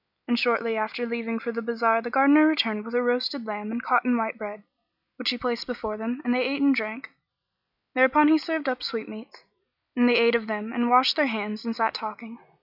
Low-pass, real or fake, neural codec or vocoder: 5.4 kHz; real; none